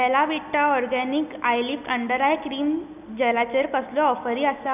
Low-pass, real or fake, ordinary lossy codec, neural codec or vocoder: 3.6 kHz; real; none; none